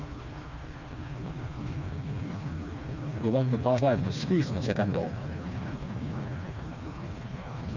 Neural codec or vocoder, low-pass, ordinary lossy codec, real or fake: codec, 16 kHz, 2 kbps, FreqCodec, smaller model; 7.2 kHz; none; fake